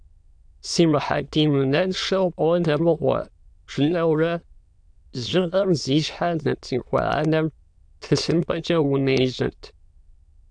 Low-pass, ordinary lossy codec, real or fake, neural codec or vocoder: 9.9 kHz; AAC, 64 kbps; fake; autoencoder, 22.05 kHz, a latent of 192 numbers a frame, VITS, trained on many speakers